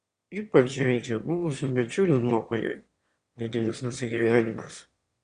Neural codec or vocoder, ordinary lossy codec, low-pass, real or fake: autoencoder, 22.05 kHz, a latent of 192 numbers a frame, VITS, trained on one speaker; Opus, 64 kbps; 9.9 kHz; fake